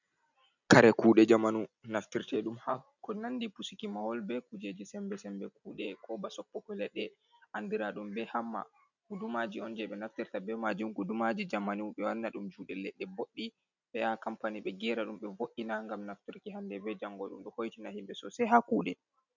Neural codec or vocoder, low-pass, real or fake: none; 7.2 kHz; real